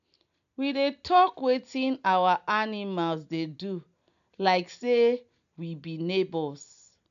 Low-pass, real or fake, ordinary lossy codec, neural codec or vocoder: 7.2 kHz; real; none; none